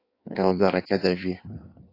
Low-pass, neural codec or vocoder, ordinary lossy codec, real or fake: 5.4 kHz; codec, 16 kHz in and 24 kHz out, 1.1 kbps, FireRedTTS-2 codec; AAC, 32 kbps; fake